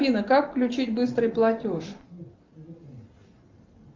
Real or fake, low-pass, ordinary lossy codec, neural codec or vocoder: real; 7.2 kHz; Opus, 24 kbps; none